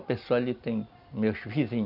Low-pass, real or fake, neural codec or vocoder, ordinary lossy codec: 5.4 kHz; real; none; none